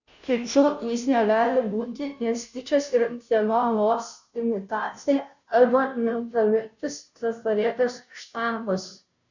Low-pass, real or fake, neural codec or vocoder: 7.2 kHz; fake; codec, 16 kHz, 0.5 kbps, FunCodec, trained on Chinese and English, 25 frames a second